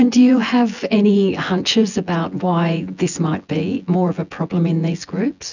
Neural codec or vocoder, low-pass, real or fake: vocoder, 24 kHz, 100 mel bands, Vocos; 7.2 kHz; fake